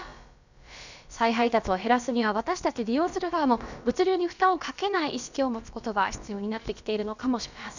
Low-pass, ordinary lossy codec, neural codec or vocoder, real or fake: 7.2 kHz; none; codec, 16 kHz, about 1 kbps, DyCAST, with the encoder's durations; fake